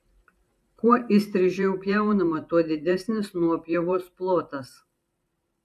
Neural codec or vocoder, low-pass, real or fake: vocoder, 44.1 kHz, 128 mel bands every 512 samples, BigVGAN v2; 14.4 kHz; fake